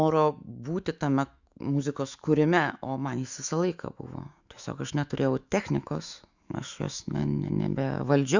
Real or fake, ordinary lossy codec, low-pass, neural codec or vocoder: fake; Opus, 64 kbps; 7.2 kHz; autoencoder, 48 kHz, 128 numbers a frame, DAC-VAE, trained on Japanese speech